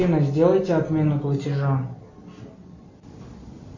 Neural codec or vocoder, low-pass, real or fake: none; 7.2 kHz; real